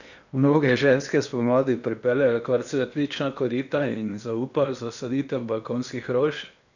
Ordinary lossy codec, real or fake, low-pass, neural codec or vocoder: none; fake; 7.2 kHz; codec, 16 kHz in and 24 kHz out, 0.8 kbps, FocalCodec, streaming, 65536 codes